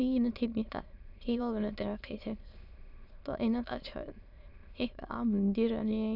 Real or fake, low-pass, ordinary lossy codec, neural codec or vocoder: fake; 5.4 kHz; none; autoencoder, 22.05 kHz, a latent of 192 numbers a frame, VITS, trained on many speakers